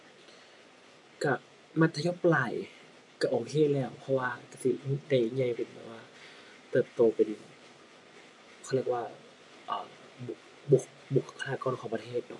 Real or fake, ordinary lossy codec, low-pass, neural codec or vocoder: fake; AAC, 64 kbps; 10.8 kHz; vocoder, 24 kHz, 100 mel bands, Vocos